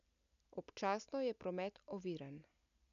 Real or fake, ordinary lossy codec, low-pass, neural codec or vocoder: real; none; 7.2 kHz; none